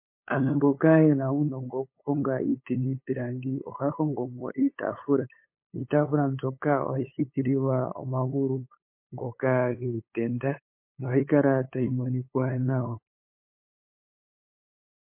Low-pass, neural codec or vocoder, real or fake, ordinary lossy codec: 3.6 kHz; codec, 16 kHz, 8 kbps, FunCodec, trained on LibriTTS, 25 frames a second; fake; MP3, 24 kbps